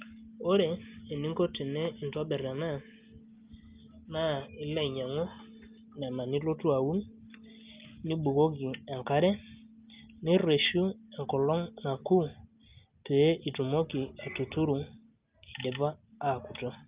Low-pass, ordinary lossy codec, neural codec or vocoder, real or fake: 3.6 kHz; Opus, 24 kbps; none; real